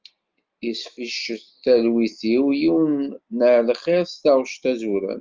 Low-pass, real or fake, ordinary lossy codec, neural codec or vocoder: 7.2 kHz; real; Opus, 32 kbps; none